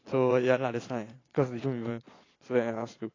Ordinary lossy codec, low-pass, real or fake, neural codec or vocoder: AAC, 32 kbps; 7.2 kHz; fake; vocoder, 22.05 kHz, 80 mel bands, Vocos